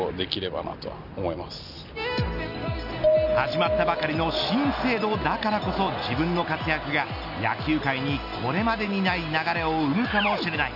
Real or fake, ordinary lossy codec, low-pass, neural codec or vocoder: real; none; 5.4 kHz; none